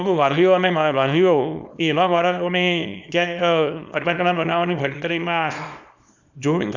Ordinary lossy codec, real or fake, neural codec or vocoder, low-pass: none; fake; codec, 24 kHz, 0.9 kbps, WavTokenizer, small release; 7.2 kHz